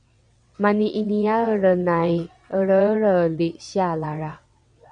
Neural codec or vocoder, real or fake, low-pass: vocoder, 22.05 kHz, 80 mel bands, WaveNeXt; fake; 9.9 kHz